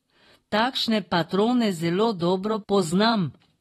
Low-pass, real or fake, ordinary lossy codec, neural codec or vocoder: 19.8 kHz; fake; AAC, 32 kbps; vocoder, 44.1 kHz, 128 mel bands, Pupu-Vocoder